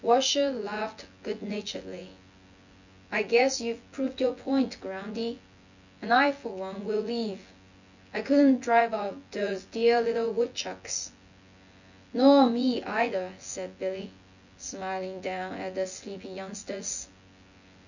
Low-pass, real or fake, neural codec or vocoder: 7.2 kHz; fake; vocoder, 24 kHz, 100 mel bands, Vocos